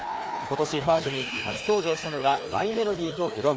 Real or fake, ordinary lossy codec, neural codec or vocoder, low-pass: fake; none; codec, 16 kHz, 2 kbps, FreqCodec, larger model; none